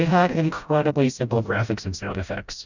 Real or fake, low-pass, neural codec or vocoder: fake; 7.2 kHz; codec, 16 kHz, 0.5 kbps, FreqCodec, smaller model